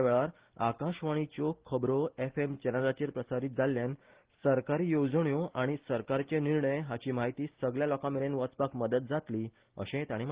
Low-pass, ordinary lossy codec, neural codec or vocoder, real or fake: 3.6 kHz; Opus, 16 kbps; none; real